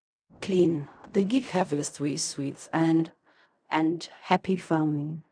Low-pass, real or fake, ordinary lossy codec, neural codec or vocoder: 9.9 kHz; fake; none; codec, 16 kHz in and 24 kHz out, 0.4 kbps, LongCat-Audio-Codec, fine tuned four codebook decoder